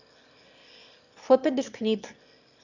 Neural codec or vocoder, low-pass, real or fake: autoencoder, 22.05 kHz, a latent of 192 numbers a frame, VITS, trained on one speaker; 7.2 kHz; fake